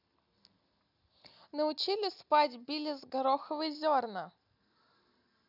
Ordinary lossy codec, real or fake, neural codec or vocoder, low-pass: none; real; none; 5.4 kHz